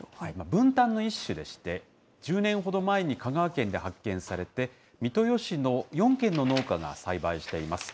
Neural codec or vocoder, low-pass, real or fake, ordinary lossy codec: none; none; real; none